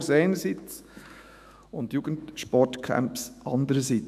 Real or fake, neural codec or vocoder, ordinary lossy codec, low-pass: fake; autoencoder, 48 kHz, 128 numbers a frame, DAC-VAE, trained on Japanese speech; none; 14.4 kHz